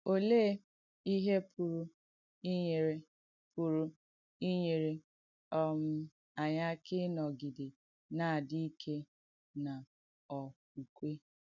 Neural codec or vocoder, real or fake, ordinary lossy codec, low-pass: none; real; none; 7.2 kHz